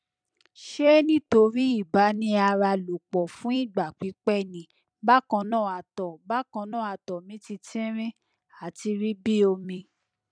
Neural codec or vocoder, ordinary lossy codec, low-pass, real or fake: vocoder, 44.1 kHz, 128 mel bands every 256 samples, BigVGAN v2; none; 9.9 kHz; fake